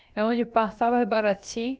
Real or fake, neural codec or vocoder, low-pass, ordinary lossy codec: fake; codec, 16 kHz, about 1 kbps, DyCAST, with the encoder's durations; none; none